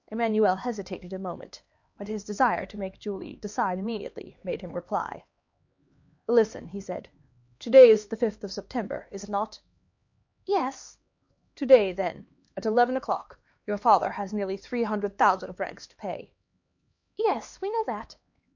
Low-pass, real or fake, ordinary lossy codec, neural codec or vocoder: 7.2 kHz; fake; MP3, 48 kbps; codec, 16 kHz, 2 kbps, X-Codec, HuBERT features, trained on LibriSpeech